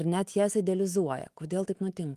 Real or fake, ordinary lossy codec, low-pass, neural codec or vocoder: fake; Opus, 24 kbps; 14.4 kHz; autoencoder, 48 kHz, 128 numbers a frame, DAC-VAE, trained on Japanese speech